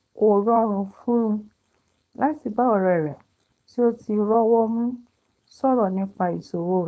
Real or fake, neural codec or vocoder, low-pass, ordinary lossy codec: fake; codec, 16 kHz, 4.8 kbps, FACodec; none; none